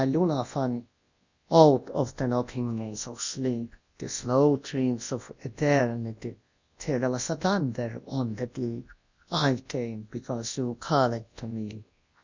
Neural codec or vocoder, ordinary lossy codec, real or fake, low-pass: codec, 24 kHz, 0.9 kbps, WavTokenizer, large speech release; Opus, 64 kbps; fake; 7.2 kHz